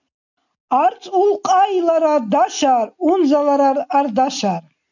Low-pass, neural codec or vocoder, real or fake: 7.2 kHz; none; real